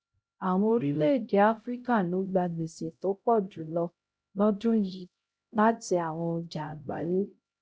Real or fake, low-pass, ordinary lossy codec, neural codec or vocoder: fake; none; none; codec, 16 kHz, 0.5 kbps, X-Codec, HuBERT features, trained on LibriSpeech